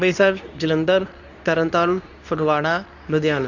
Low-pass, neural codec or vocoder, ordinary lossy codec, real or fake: 7.2 kHz; codec, 24 kHz, 0.9 kbps, WavTokenizer, medium speech release version 2; none; fake